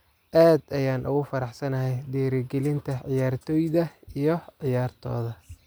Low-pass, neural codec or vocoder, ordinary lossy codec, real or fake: none; none; none; real